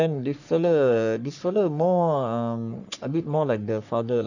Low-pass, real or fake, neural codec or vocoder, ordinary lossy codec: 7.2 kHz; fake; codec, 44.1 kHz, 3.4 kbps, Pupu-Codec; none